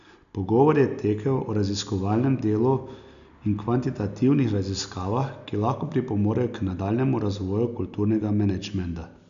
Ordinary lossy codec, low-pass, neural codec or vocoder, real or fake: none; 7.2 kHz; none; real